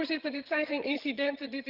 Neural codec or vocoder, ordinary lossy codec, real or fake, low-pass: vocoder, 22.05 kHz, 80 mel bands, HiFi-GAN; Opus, 24 kbps; fake; 5.4 kHz